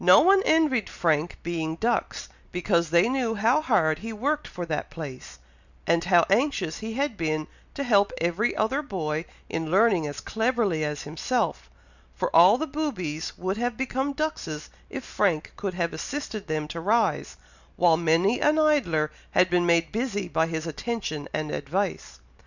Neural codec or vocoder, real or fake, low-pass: none; real; 7.2 kHz